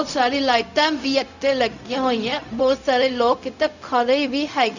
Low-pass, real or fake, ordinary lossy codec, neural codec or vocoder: 7.2 kHz; fake; none; codec, 16 kHz, 0.4 kbps, LongCat-Audio-Codec